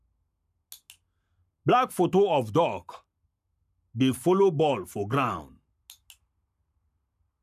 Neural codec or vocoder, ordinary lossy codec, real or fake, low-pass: codec, 44.1 kHz, 7.8 kbps, Pupu-Codec; none; fake; 14.4 kHz